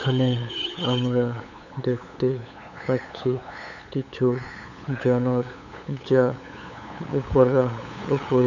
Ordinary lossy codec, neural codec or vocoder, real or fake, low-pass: none; codec, 16 kHz, 8 kbps, FunCodec, trained on LibriTTS, 25 frames a second; fake; 7.2 kHz